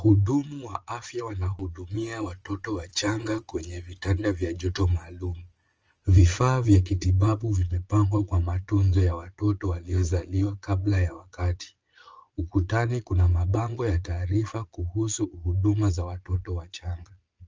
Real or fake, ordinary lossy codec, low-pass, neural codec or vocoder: fake; Opus, 24 kbps; 7.2 kHz; vocoder, 22.05 kHz, 80 mel bands, Vocos